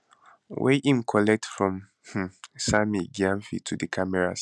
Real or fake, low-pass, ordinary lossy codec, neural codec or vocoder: real; none; none; none